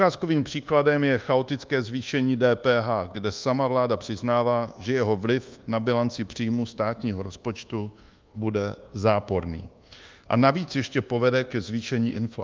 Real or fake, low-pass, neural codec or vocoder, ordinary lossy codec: fake; 7.2 kHz; codec, 24 kHz, 1.2 kbps, DualCodec; Opus, 32 kbps